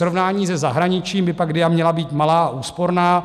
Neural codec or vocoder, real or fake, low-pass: none; real; 14.4 kHz